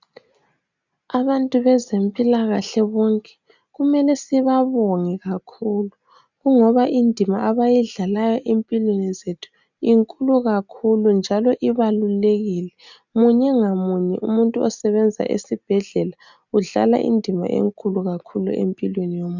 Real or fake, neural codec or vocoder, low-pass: real; none; 7.2 kHz